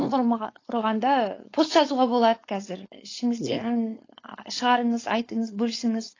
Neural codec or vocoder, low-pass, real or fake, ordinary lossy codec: codec, 16 kHz, 4.8 kbps, FACodec; 7.2 kHz; fake; AAC, 32 kbps